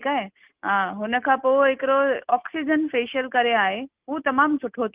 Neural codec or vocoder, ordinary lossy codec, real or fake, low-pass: none; Opus, 32 kbps; real; 3.6 kHz